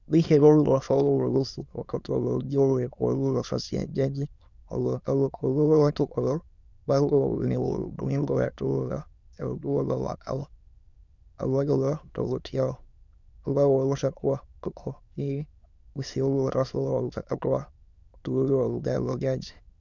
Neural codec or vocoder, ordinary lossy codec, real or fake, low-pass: autoencoder, 22.05 kHz, a latent of 192 numbers a frame, VITS, trained on many speakers; Opus, 64 kbps; fake; 7.2 kHz